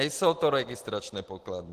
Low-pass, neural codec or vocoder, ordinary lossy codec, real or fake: 14.4 kHz; none; Opus, 16 kbps; real